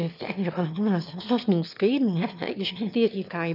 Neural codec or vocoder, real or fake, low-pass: autoencoder, 22.05 kHz, a latent of 192 numbers a frame, VITS, trained on one speaker; fake; 5.4 kHz